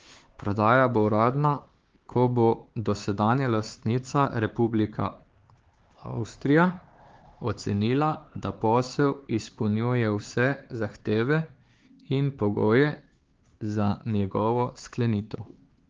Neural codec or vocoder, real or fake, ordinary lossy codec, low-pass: codec, 16 kHz, 4 kbps, X-Codec, HuBERT features, trained on LibriSpeech; fake; Opus, 16 kbps; 7.2 kHz